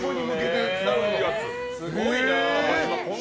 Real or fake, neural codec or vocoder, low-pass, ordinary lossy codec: real; none; none; none